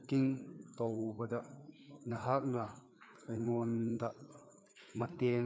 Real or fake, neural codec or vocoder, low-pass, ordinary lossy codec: fake; codec, 16 kHz, 4 kbps, FreqCodec, larger model; none; none